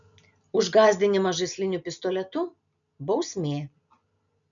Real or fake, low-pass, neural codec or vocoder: real; 7.2 kHz; none